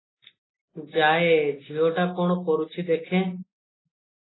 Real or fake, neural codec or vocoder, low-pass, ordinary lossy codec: real; none; 7.2 kHz; AAC, 16 kbps